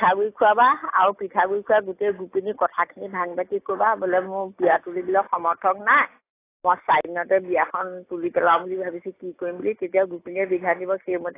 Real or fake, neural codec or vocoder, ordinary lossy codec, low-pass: real; none; AAC, 24 kbps; 3.6 kHz